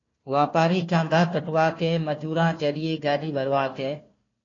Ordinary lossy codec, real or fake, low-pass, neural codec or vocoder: AAC, 32 kbps; fake; 7.2 kHz; codec, 16 kHz, 1 kbps, FunCodec, trained on Chinese and English, 50 frames a second